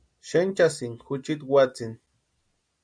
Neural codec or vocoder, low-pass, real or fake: none; 9.9 kHz; real